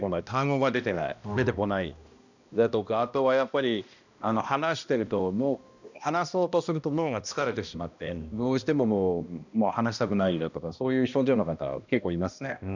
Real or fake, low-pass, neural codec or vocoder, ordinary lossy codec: fake; 7.2 kHz; codec, 16 kHz, 1 kbps, X-Codec, HuBERT features, trained on balanced general audio; none